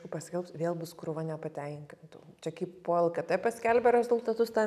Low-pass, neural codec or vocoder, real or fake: 14.4 kHz; none; real